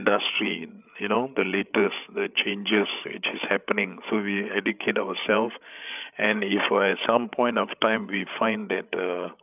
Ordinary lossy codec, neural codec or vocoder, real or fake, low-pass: none; codec, 16 kHz, 8 kbps, FreqCodec, larger model; fake; 3.6 kHz